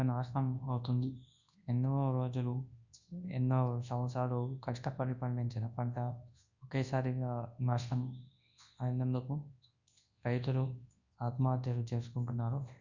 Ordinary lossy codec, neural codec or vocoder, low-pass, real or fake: Opus, 64 kbps; codec, 24 kHz, 0.9 kbps, WavTokenizer, large speech release; 7.2 kHz; fake